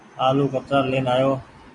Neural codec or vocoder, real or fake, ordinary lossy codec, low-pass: none; real; MP3, 48 kbps; 10.8 kHz